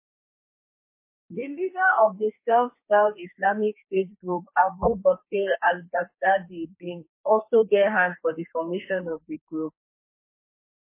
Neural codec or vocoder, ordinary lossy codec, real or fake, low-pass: codec, 32 kHz, 1.9 kbps, SNAC; MP3, 24 kbps; fake; 3.6 kHz